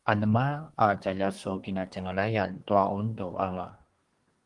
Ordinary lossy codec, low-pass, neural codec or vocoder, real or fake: Opus, 24 kbps; 10.8 kHz; codec, 24 kHz, 1 kbps, SNAC; fake